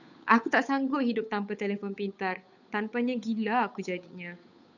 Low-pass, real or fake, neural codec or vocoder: 7.2 kHz; fake; codec, 16 kHz, 8 kbps, FunCodec, trained on Chinese and English, 25 frames a second